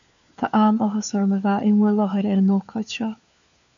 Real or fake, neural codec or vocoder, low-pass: fake; codec, 16 kHz, 4 kbps, FunCodec, trained on LibriTTS, 50 frames a second; 7.2 kHz